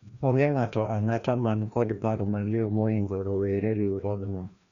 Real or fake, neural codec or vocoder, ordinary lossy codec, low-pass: fake; codec, 16 kHz, 1 kbps, FreqCodec, larger model; Opus, 64 kbps; 7.2 kHz